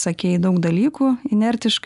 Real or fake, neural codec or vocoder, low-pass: real; none; 10.8 kHz